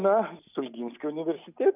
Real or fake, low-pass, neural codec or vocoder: real; 3.6 kHz; none